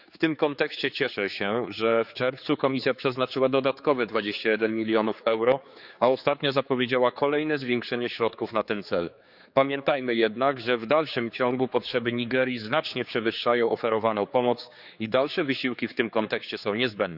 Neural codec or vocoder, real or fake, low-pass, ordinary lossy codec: codec, 16 kHz, 4 kbps, X-Codec, HuBERT features, trained on general audio; fake; 5.4 kHz; none